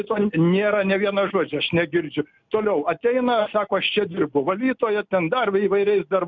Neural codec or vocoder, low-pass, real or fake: none; 7.2 kHz; real